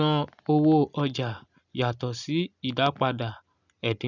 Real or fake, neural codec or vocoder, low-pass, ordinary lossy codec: real; none; 7.2 kHz; none